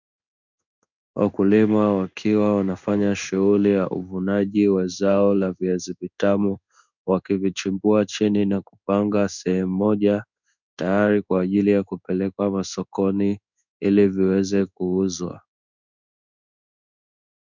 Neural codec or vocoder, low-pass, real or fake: codec, 16 kHz in and 24 kHz out, 1 kbps, XY-Tokenizer; 7.2 kHz; fake